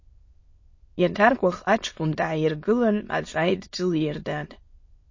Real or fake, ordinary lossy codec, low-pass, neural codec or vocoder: fake; MP3, 32 kbps; 7.2 kHz; autoencoder, 22.05 kHz, a latent of 192 numbers a frame, VITS, trained on many speakers